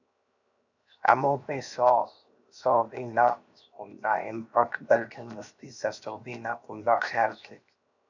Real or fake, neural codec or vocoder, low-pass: fake; codec, 16 kHz, 0.7 kbps, FocalCodec; 7.2 kHz